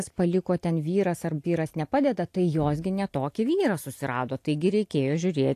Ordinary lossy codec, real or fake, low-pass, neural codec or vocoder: AAC, 64 kbps; real; 14.4 kHz; none